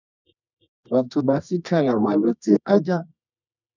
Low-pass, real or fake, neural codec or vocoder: 7.2 kHz; fake; codec, 24 kHz, 0.9 kbps, WavTokenizer, medium music audio release